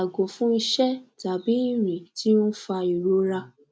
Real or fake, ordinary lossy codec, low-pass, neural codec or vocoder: real; none; none; none